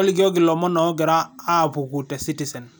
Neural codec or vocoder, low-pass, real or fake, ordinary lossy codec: none; none; real; none